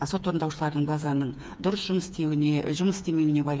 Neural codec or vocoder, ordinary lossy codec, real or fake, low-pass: codec, 16 kHz, 4 kbps, FreqCodec, smaller model; none; fake; none